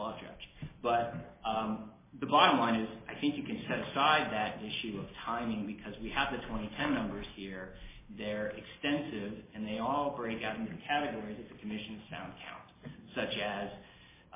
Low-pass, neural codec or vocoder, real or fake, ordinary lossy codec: 3.6 kHz; none; real; MP3, 16 kbps